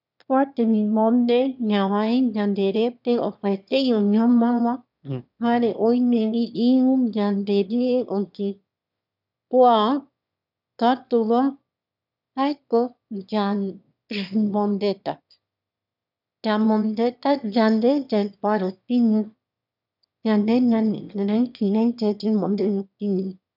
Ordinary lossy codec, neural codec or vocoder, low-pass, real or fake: none; autoencoder, 22.05 kHz, a latent of 192 numbers a frame, VITS, trained on one speaker; 5.4 kHz; fake